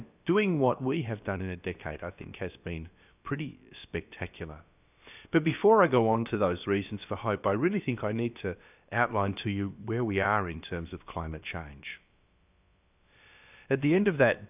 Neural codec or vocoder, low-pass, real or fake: codec, 16 kHz, about 1 kbps, DyCAST, with the encoder's durations; 3.6 kHz; fake